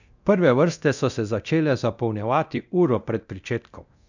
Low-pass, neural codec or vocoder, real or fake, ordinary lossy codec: 7.2 kHz; codec, 24 kHz, 0.9 kbps, DualCodec; fake; none